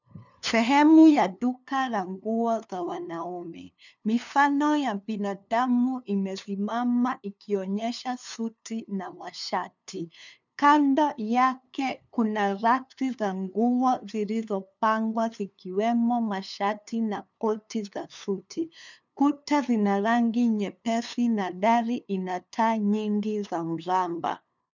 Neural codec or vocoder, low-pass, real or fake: codec, 16 kHz, 2 kbps, FunCodec, trained on LibriTTS, 25 frames a second; 7.2 kHz; fake